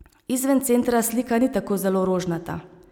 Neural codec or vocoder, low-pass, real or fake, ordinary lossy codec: none; 19.8 kHz; real; none